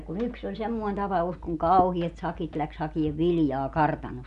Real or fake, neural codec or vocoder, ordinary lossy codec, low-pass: real; none; none; 10.8 kHz